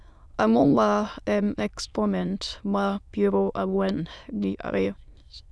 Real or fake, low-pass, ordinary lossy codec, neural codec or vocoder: fake; none; none; autoencoder, 22.05 kHz, a latent of 192 numbers a frame, VITS, trained on many speakers